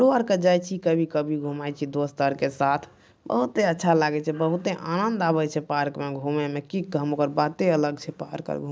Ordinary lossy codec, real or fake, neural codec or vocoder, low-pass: none; real; none; none